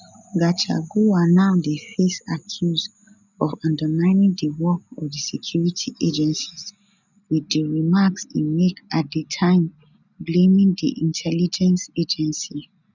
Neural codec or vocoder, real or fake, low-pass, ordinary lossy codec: none; real; 7.2 kHz; none